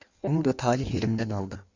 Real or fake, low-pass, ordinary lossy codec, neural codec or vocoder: fake; 7.2 kHz; Opus, 64 kbps; codec, 16 kHz in and 24 kHz out, 1.1 kbps, FireRedTTS-2 codec